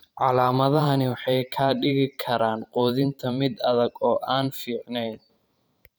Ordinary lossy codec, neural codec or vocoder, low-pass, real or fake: none; vocoder, 44.1 kHz, 128 mel bands every 512 samples, BigVGAN v2; none; fake